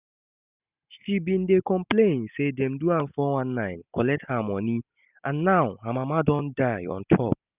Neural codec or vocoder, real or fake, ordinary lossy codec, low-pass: none; real; none; 3.6 kHz